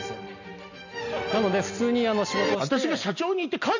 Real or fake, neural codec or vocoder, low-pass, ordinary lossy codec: real; none; 7.2 kHz; none